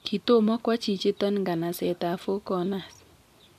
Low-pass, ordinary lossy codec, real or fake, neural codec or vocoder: 14.4 kHz; none; fake; vocoder, 44.1 kHz, 128 mel bands every 256 samples, BigVGAN v2